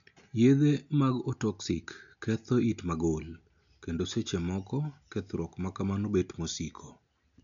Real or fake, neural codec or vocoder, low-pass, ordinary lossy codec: real; none; 7.2 kHz; none